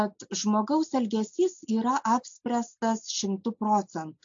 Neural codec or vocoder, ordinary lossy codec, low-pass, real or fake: none; AAC, 64 kbps; 7.2 kHz; real